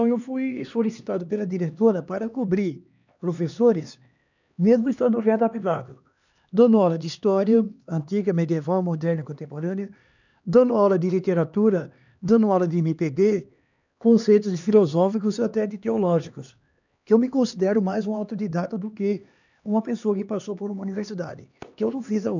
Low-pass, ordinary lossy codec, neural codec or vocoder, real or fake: 7.2 kHz; none; codec, 16 kHz, 2 kbps, X-Codec, HuBERT features, trained on LibriSpeech; fake